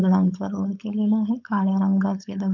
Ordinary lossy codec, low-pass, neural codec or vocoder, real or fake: none; 7.2 kHz; codec, 16 kHz, 4.8 kbps, FACodec; fake